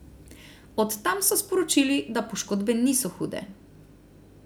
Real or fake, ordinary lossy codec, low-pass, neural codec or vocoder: real; none; none; none